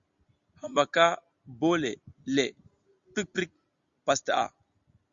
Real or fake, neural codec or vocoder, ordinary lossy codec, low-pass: real; none; Opus, 64 kbps; 7.2 kHz